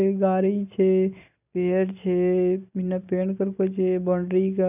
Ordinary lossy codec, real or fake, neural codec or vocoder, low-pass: none; real; none; 3.6 kHz